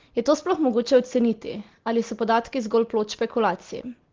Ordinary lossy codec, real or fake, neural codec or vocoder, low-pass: Opus, 16 kbps; real; none; 7.2 kHz